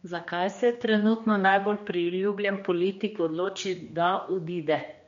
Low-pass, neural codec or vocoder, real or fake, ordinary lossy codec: 7.2 kHz; codec, 16 kHz, 2 kbps, X-Codec, HuBERT features, trained on general audio; fake; MP3, 48 kbps